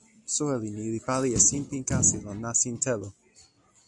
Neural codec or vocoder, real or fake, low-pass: vocoder, 44.1 kHz, 128 mel bands every 256 samples, BigVGAN v2; fake; 10.8 kHz